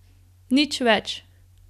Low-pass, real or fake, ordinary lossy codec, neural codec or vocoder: 14.4 kHz; real; none; none